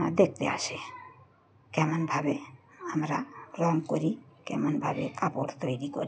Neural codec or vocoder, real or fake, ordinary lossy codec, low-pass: none; real; none; none